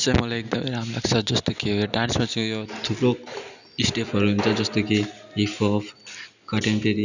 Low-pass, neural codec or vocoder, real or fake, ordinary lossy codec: 7.2 kHz; none; real; none